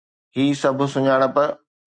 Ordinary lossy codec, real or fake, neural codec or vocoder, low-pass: Opus, 64 kbps; real; none; 9.9 kHz